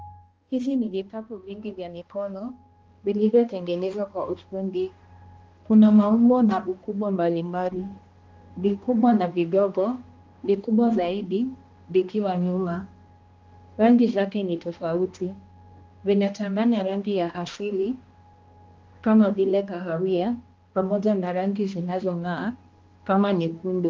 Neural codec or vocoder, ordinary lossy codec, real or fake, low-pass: codec, 16 kHz, 1 kbps, X-Codec, HuBERT features, trained on balanced general audio; Opus, 24 kbps; fake; 7.2 kHz